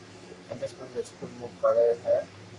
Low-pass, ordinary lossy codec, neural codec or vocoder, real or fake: 10.8 kHz; AAC, 64 kbps; codec, 44.1 kHz, 3.4 kbps, Pupu-Codec; fake